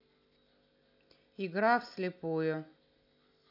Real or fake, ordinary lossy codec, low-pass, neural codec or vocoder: real; none; 5.4 kHz; none